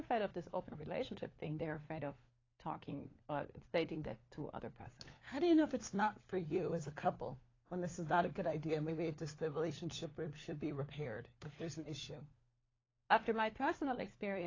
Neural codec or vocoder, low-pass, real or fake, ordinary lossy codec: codec, 16 kHz, 4 kbps, FunCodec, trained on LibriTTS, 50 frames a second; 7.2 kHz; fake; AAC, 32 kbps